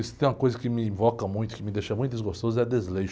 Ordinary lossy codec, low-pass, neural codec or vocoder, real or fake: none; none; none; real